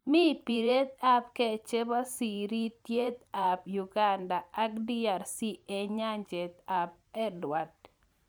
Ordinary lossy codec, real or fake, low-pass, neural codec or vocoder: none; fake; none; vocoder, 44.1 kHz, 128 mel bands every 512 samples, BigVGAN v2